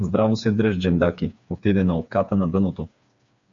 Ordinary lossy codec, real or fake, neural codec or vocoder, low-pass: MP3, 64 kbps; fake; codec, 16 kHz, 4 kbps, FreqCodec, smaller model; 7.2 kHz